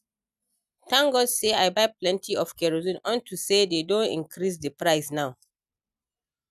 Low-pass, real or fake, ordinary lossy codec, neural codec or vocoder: 14.4 kHz; real; none; none